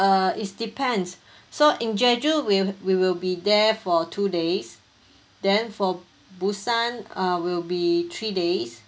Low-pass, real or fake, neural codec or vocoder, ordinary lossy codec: none; real; none; none